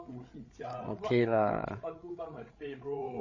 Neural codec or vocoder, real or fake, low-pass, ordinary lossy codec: codec, 16 kHz, 8 kbps, FreqCodec, larger model; fake; 7.2 kHz; MP3, 32 kbps